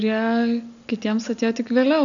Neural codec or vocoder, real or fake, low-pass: none; real; 7.2 kHz